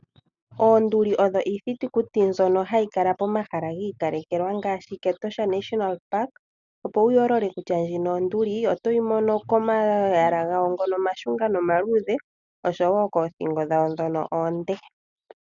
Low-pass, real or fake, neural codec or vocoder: 7.2 kHz; real; none